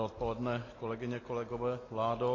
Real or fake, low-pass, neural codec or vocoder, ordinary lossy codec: real; 7.2 kHz; none; AAC, 32 kbps